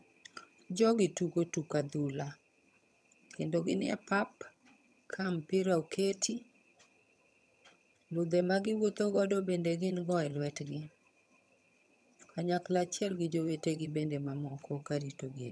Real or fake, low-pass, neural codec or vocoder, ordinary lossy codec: fake; none; vocoder, 22.05 kHz, 80 mel bands, HiFi-GAN; none